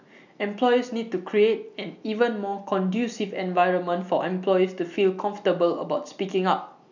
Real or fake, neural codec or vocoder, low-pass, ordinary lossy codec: real; none; 7.2 kHz; none